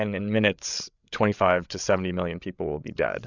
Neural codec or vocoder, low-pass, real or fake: codec, 16 kHz, 8 kbps, FreqCodec, larger model; 7.2 kHz; fake